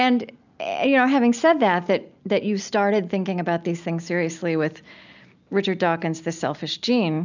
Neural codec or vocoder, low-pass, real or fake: none; 7.2 kHz; real